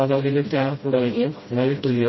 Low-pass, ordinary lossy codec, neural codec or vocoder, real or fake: 7.2 kHz; MP3, 24 kbps; codec, 16 kHz, 0.5 kbps, FreqCodec, smaller model; fake